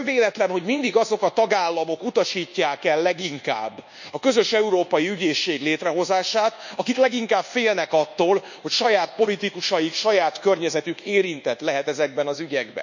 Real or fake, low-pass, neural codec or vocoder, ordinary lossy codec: fake; 7.2 kHz; codec, 24 kHz, 1.2 kbps, DualCodec; none